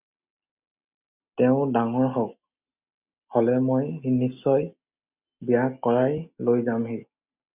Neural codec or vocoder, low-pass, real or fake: none; 3.6 kHz; real